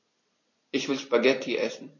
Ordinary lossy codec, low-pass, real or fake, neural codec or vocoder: MP3, 32 kbps; 7.2 kHz; real; none